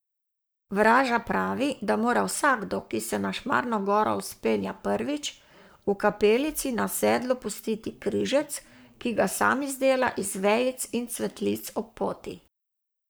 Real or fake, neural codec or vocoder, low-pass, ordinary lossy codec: fake; codec, 44.1 kHz, 7.8 kbps, Pupu-Codec; none; none